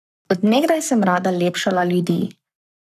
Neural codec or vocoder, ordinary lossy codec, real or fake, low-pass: codec, 44.1 kHz, 7.8 kbps, Pupu-Codec; none; fake; 14.4 kHz